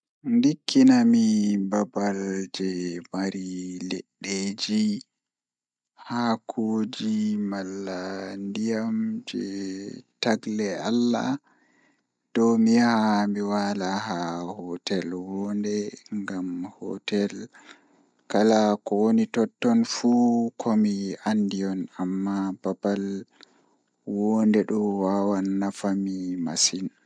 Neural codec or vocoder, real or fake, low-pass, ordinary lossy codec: none; real; 9.9 kHz; MP3, 96 kbps